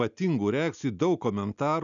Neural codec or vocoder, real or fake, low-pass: none; real; 7.2 kHz